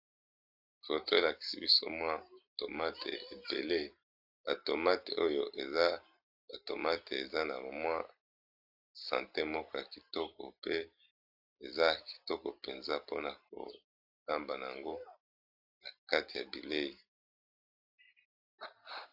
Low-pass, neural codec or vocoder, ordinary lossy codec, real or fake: 5.4 kHz; vocoder, 44.1 kHz, 128 mel bands every 256 samples, BigVGAN v2; AAC, 48 kbps; fake